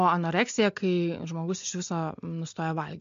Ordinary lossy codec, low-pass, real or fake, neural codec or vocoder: MP3, 48 kbps; 7.2 kHz; real; none